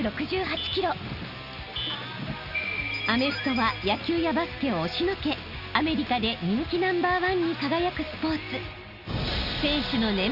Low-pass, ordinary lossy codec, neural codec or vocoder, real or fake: 5.4 kHz; Opus, 64 kbps; none; real